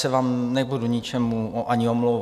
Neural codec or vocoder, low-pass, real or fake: none; 14.4 kHz; real